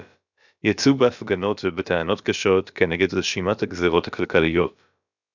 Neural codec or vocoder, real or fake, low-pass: codec, 16 kHz, about 1 kbps, DyCAST, with the encoder's durations; fake; 7.2 kHz